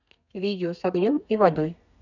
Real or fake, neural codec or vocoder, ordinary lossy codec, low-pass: fake; codec, 32 kHz, 1.9 kbps, SNAC; none; 7.2 kHz